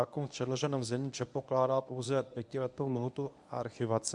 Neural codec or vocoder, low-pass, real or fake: codec, 24 kHz, 0.9 kbps, WavTokenizer, medium speech release version 1; 10.8 kHz; fake